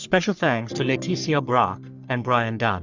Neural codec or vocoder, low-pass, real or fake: codec, 44.1 kHz, 3.4 kbps, Pupu-Codec; 7.2 kHz; fake